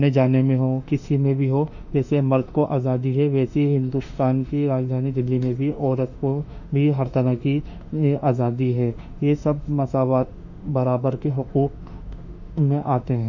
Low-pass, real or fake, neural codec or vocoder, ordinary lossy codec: 7.2 kHz; fake; autoencoder, 48 kHz, 32 numbers a frame, DAC-VAE, trained on Japanese speech; none